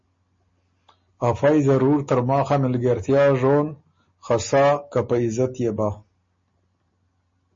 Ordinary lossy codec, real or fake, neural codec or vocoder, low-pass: MP3, 32 kbps; real; none; 7.2 kHz